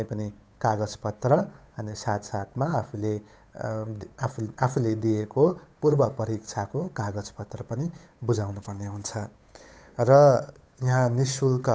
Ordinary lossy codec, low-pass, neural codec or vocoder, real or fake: none; none; codec, 16 kHz, 8 kbps, FunCodec, trained on Chinese and English, 25 frames a second; fake